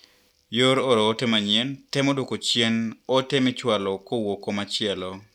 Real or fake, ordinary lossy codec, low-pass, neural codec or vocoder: real; none; 19.8 kHz; none